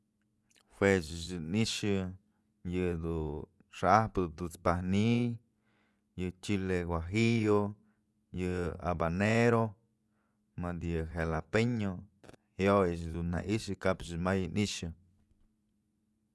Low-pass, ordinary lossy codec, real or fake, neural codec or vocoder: none; none; fake; vocoder, 24 kHz, 100 mel bands, Vocos